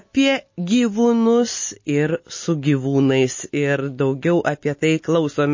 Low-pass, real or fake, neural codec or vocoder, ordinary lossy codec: 7.2 kHz; real; none; MP3, 32 kbps